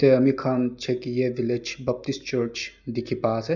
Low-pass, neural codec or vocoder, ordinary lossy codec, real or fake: 7.2 kHz; none; none; real